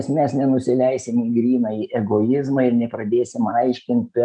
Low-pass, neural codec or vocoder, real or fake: 9.9 kHz; none; real